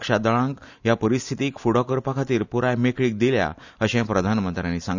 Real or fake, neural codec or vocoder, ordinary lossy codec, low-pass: real; none; none; 7.2 kHz